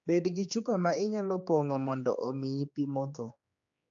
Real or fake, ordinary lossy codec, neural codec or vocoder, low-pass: fake; AAC, 64 kbps; codec, 16 kHz, 2 kbps, X-Codec, HuBERT features, trained on general audio; 7.2 kHz